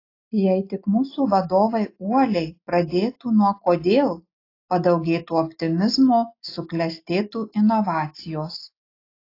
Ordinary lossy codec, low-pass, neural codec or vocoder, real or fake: AAC, 32 kbps; 5.4 kHz; none; real